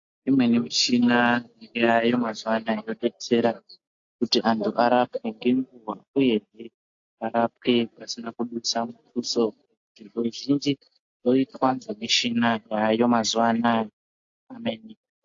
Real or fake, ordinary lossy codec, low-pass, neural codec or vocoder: real; AAC, 48 kbps; 7.2 kHz; none